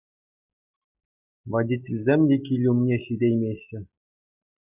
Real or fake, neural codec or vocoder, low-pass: real; none; 3.6 kHz